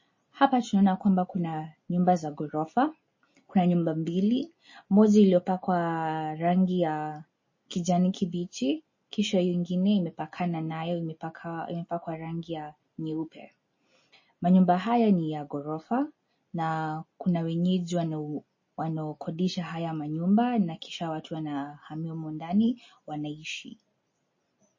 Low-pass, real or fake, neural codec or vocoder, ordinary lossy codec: 7.2 kHz; real; none; MP3, 32 kbps